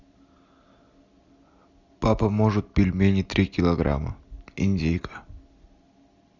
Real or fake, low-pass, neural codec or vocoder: real; 7.2 kHz; none